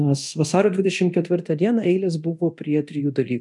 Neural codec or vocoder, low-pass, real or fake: codec, 24 kHz, 0.9 kbps, DualCodec; 10.8 kHz; fake